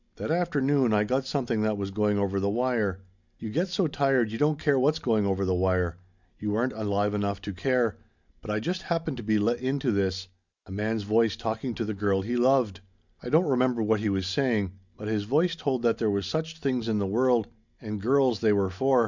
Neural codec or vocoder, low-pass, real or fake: none; 7.2 kHz; real